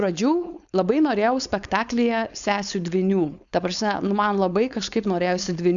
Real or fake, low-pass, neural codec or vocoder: fake; 7.2 kHz; codec, 16 kHz, 4.8 kbps, FACodec